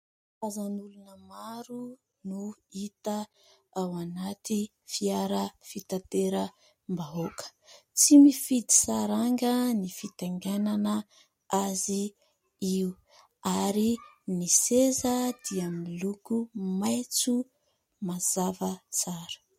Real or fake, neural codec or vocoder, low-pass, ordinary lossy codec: real; none; 19.8 kHz; MP3, 64 kbps